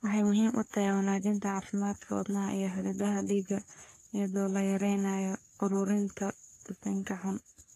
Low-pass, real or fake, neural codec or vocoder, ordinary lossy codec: 14.4 kHz; fake; codec, 44.1 kHz, 3.4 kbps, Pupu-Codec; AAC, 64 kbps